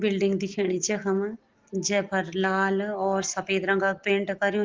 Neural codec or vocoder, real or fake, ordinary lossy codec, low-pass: none; real; Opus, 16 kbps; 7.2 kHz